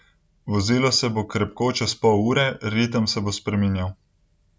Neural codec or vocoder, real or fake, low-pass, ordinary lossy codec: none; real; none; none